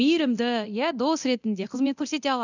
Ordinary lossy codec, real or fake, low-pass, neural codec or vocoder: none; fake; 7.2 kHz; codec, 24 kHz, 0.9 kbps, DualCodec